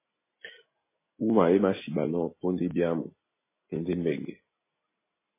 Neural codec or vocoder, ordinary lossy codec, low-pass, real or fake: none; MP3, 16 kbps; 3.6 kHz; real